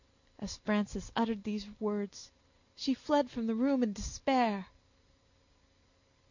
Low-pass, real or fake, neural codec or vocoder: 7.2 kHz; real; none